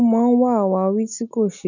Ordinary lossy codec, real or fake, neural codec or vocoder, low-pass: none; real; none; 7.2 kHz